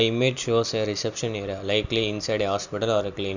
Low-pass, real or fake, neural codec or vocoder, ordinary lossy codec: 7.2 kHz; real; none; none